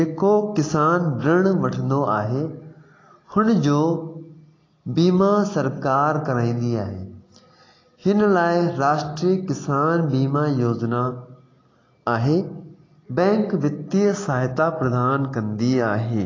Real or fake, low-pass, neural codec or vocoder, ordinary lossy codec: real; 7.2 kHz; none; AAC, 32 kbps